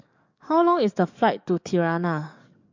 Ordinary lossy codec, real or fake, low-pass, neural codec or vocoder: MP3, 64 kbps; fake; 7.2 kHz; codec, 44.1 kHz, 7.8 kbps, DAC